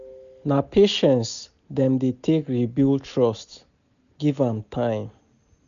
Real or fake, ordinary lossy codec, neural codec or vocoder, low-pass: real; none; none; 7.2 kHz